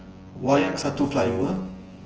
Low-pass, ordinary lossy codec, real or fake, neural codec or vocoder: 7.2 kHz; Opus, 16 kbps; fake; vocoder, 24 kHz, 100 mel bands, Vocos